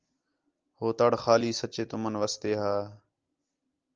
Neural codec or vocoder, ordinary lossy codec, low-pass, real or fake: none; Opus, 24 kbps; 7.2 kHz; real